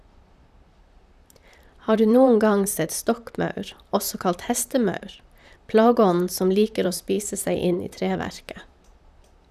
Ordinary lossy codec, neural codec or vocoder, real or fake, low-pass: none; vocoder, 44.1 kHz, 128 mel bands, Pupu-Vocoder; fake; 14.4 kHz